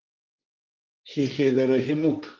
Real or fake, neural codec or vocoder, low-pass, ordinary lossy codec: fake; codec, 16 kHz, 1.1 kbps, Voila-Tokenizer; 7.2 kHz; Opus, 32 kbps